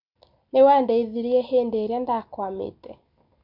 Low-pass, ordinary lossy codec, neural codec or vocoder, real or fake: 5.4 kHz; none; none; real